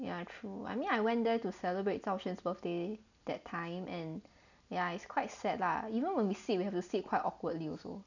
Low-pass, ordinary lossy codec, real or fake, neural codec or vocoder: 7.2 kHz; none; real; none